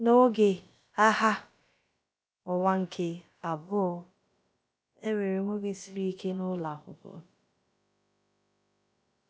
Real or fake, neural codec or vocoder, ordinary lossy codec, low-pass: fake; codec, 16 kHz, about 1 kbps, DyCAST, with the encoder's durations; none; none